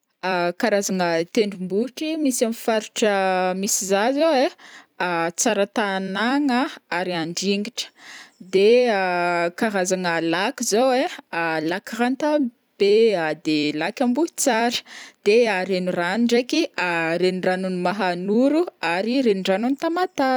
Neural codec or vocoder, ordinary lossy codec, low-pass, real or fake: vocoder, 44.1 kHz, 128 mel bands every 256 samples, BigVGAN v2; none; none; fake